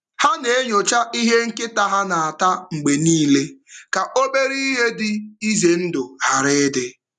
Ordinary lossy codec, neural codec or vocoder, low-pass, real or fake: none; none; 10.8 kHz; real